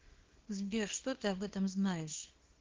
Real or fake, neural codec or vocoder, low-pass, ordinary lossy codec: fake; codec, 24 kHz, 0.9 kbps, WavTokenizer, small release; 7.2 kHz; Opus, 16 kbps